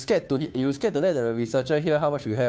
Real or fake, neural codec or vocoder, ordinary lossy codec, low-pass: fake; codec, 16 kHz, 2 kbps, FunCodec, trained on Chinese and English, 25 frames a second; none; none